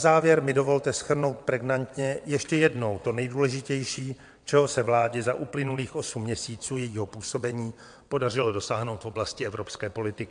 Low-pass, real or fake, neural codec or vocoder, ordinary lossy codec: 9.9 kHz; fake; vocoder, 22.05 kHz, 80 mel bands, WaveNeXt; MP3, 64 kbps